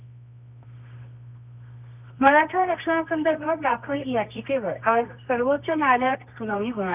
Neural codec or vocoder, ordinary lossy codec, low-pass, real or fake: codec, 24 kHz, 0.9 kbps, WavTokenizer, medium music audio release; none; 3.6 kHz; fake